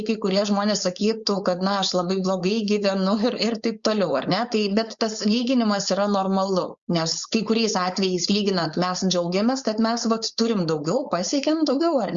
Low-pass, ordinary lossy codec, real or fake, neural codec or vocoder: 7.2 kHz; Opus, 64 kbps; fake; codec, 16 kHz, 4.8 kbps, FACodec